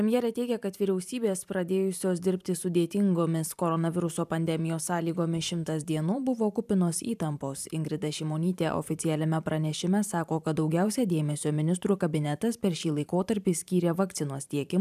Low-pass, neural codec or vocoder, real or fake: 14.4 kHz; vocoder, 44.1 kHz, 128 mel bands every 512 samples, BigVGAN v2; fake